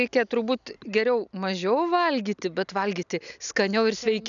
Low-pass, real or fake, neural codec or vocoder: 7.2 kHz; real; none